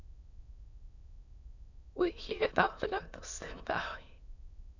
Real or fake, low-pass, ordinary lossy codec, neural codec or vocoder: fake; 7.2 kHz; none; autoencoder, 22.05 kHz, a latent of 192 numbers a frame, VITS, trained on many speakers